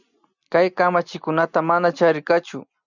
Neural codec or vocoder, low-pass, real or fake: none; 7.2 kHz; real